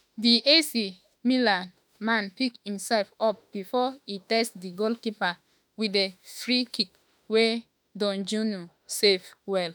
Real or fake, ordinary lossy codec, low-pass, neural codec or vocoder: fake; none; none; autoencoder, 48 kHz, 32 numbers a frame, DAC-VAE, trained on Japanese speech